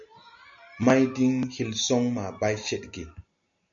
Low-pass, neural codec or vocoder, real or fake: 7.2 kHz; none; real